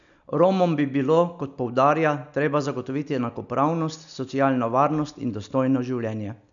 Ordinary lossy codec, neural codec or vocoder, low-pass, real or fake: none; none; 7.2 kHz; real